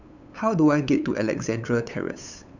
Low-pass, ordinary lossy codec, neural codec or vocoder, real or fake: 7.2 kHz; none; codec, 16 kHz, 8 kbps, FunCodec, trained on LibriTTS, 25 frames a second; fake